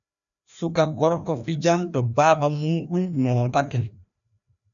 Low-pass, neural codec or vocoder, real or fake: 7.2 kHz; codec, 16 kHz, 1 kbps, FreqCodec, larger model; fake